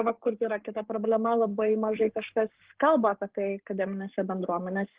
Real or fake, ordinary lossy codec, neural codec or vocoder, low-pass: fake; Opus, 16 kbps; codec, 44.1 kHz, 7.8 kbps, Pupu-Codec; 3.6 kHz